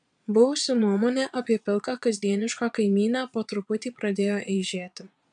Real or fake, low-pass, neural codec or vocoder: fake; 9.9 kHz; vocoder, 22.05 kHz, 80 mel bands, Vocos